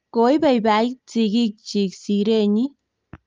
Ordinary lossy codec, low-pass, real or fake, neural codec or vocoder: Opus, 32 kbps; 7.2 kHz; real; none